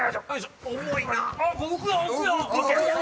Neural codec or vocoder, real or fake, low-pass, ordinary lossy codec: none; real; none; none